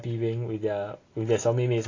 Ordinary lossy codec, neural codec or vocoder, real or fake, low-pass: AAC, 32 kbps; none; real; 7.2 kHz